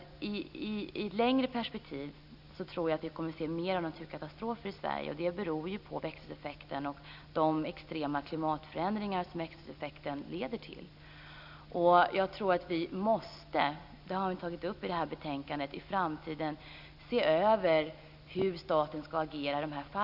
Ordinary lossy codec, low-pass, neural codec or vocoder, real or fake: none; 5.4 kHz; none; real